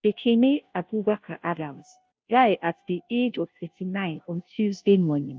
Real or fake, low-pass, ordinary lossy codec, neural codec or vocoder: fake; none; none; codec, 16 kHz, 0.5 kbps, FunCodec, trained on Chinese and English, 25 frames a second